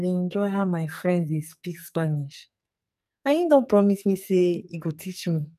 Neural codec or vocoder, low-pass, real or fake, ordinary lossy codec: codec, 44.1 kHz, 2.6 kbps, SNAC; 14.4 kHz; fake; none